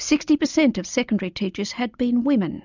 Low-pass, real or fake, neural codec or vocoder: 7.2 kHz; real; none